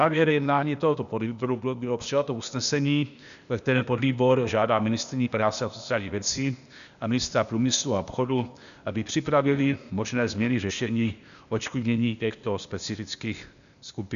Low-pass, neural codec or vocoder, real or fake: 7.2 kHz; codec, 16 kHz, 0.8 kbps, ZipCodec; fake